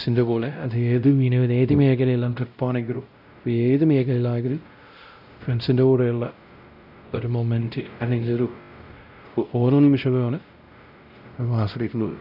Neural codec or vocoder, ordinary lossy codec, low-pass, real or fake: codec, 16 kHz, 0.5 kbps, X-Codec, WavLM features, trained on Multilingual LibriSpeech; none; 5.4 kHz; fake